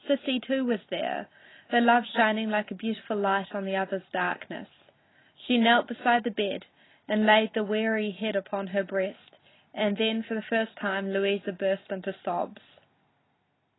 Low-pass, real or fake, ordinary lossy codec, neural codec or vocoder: 7.2 kHz; real; AAC, 16 kbps; none